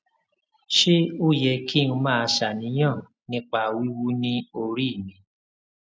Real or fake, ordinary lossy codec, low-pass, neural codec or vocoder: real; none; none; none